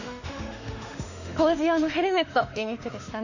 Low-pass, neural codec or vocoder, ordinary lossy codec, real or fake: 7.2 kHz; autoencoder, 48 kHz, 32 numbers a frame, DAC-VAE, trained on Japanese speech; none; fake